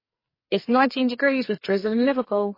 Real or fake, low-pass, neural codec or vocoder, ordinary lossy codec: fake; 5.4 kHz; codec, 44.1 kHz, 2.6 kbps, SNAC; MP3, 24 kbps